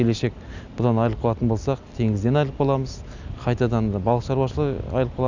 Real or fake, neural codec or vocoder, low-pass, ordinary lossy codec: real; none; 7.2 kHz; none